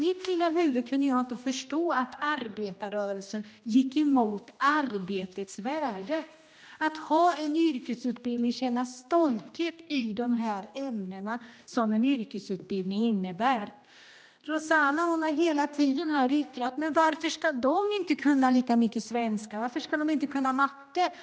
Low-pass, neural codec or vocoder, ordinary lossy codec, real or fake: none; codec, 16 kHz, 1 kbps, X-Codec, HuBERT features, trained on general audio; none; fake